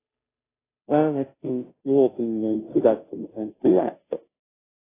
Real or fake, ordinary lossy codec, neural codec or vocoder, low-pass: fake; AAC, 16 kbps; codec, 16 kHz, 0.5 kbps, FunCodec, trained on Chinese and English, 25 frames a second; 3.6 kHz